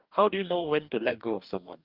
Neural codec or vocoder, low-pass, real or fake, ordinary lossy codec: codec, 44.1 kHz, 2.6 kbps, DAC; 5.4 kHz; fake; Opus, 24 kbps